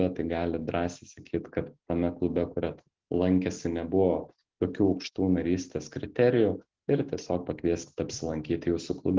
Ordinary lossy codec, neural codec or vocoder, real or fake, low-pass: Opus, 16 kbps; none; real; 7.2 kHz